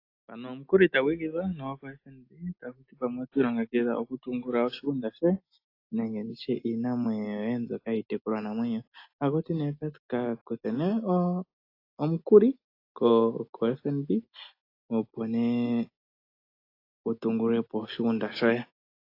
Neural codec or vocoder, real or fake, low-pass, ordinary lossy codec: none; real; 5.4 kHz; AAC, 32 kbps